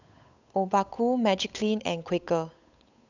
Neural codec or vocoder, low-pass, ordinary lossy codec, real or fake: codec, 16 kHz, 8 kbps, FunCodec, trained on Chinese and English, 25 frames a second; 7.2 kHz; none; fake